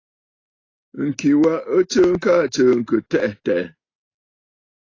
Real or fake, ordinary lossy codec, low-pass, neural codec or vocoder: real; AAC, 32 kbps; 7.2 kHz; none